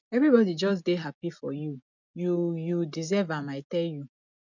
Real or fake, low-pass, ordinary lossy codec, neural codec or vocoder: real; 7.2 kHz; none; none